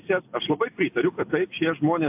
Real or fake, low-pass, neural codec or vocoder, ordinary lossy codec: real; 3.6 kHz; none; AAC, 32 kbps